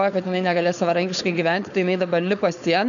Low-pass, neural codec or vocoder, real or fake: 7.2 kHz; codec, 16 kHz, 4.8 kbps, FACodec; fake